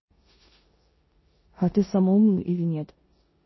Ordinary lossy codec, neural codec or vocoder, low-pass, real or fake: MP3, 24 kbps; codec, 16 kHz in and 24 kHz out, 0.9 kbps, LongCat-Audio-Codec, four codebook decoder; 7.2 kHz; fake